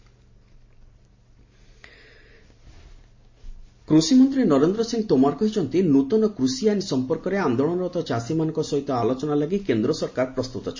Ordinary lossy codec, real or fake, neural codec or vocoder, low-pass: MP3, 32 kbps; real; none; 7.2 kHz